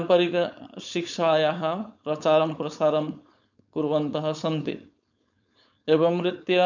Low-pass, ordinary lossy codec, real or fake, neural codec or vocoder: 7.2 kHz; none; fake; codec, 16 kHz, 4.8 kbps, FACodec